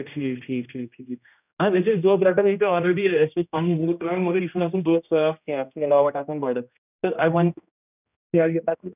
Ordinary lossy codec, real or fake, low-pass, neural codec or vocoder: none; fake; 3.6 kHz; codec, 16 kHz, 1 kbps, X-Codec, HuBERT features, trained on general audio